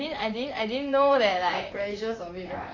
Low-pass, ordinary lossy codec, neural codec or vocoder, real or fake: 7.2 kHz; AAC, 32 kbps; vocoder, 44.1 kHz, 128 mel bands, Pupu-Vocoder; fake